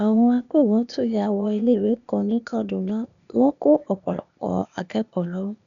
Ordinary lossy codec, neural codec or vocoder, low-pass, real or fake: MP3, 96 kbps; codec, 16 kHz, 0.8 kbps, ZipCodec; 7.2 kHz; fake